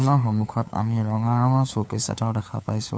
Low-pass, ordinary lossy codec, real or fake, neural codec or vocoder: none; none; fake; codec, 16 kHz, 4 kbps, FunCodec, trained on LibriTTS, 50 frames a second